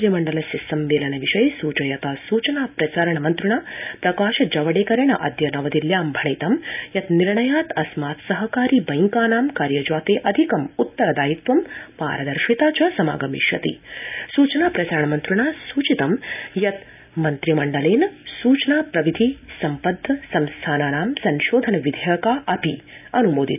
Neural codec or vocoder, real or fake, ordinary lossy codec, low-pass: none; real; none; 3.6 kHz